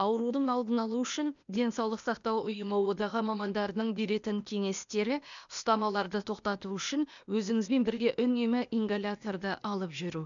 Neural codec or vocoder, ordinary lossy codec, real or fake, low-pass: codec, 16 kHz, 0.8 kbps, ZipCodec; none; fake; 7.2 kHz